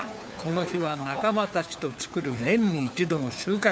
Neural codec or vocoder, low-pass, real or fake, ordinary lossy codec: codec, 16 kHz, 4 kbps, FunCodec, trained on LibriTTS, 50 frames a second; none; fake; none